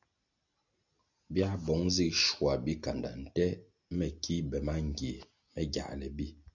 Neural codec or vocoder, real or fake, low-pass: none; real; 7.2 kHz